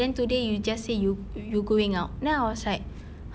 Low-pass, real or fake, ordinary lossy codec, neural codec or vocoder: none; real; none; none